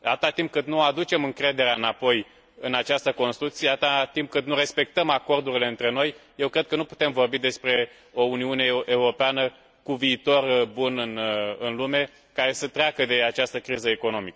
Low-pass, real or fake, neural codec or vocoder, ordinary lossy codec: none; real; none; none